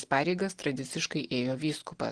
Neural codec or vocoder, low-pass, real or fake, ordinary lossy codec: none; 9.9 kHz; real; Opus, 16 kbps